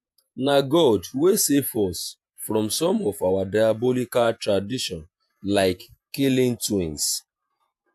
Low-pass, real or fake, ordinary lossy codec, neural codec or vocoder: 14.4 kHz; fake; AAC, 96 kbps; vocoder, 48 kHz, 128 mel bands, Vocos